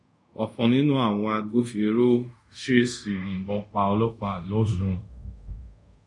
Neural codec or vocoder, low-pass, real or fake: codec, 24 kHz, 0.5 kbps, DualCodec; 10.8 kHz; fake